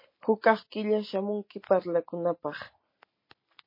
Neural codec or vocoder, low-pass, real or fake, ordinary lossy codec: none; 5.4 kHz; real; MP3, 24 kbps